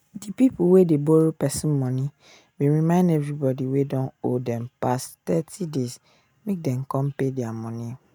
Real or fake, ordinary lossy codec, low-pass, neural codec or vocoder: real; none; none; none